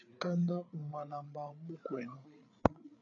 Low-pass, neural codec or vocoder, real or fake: 7.2 kHz; codec, 16 kHz, 8 kbps, FreqCodec, larger model; fake